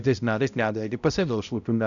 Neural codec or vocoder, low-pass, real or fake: codec, 16 kHz, 0.5 kbps, X-Codec, HuBERT features, trained on balanced general audio; 7.2 kHz; fake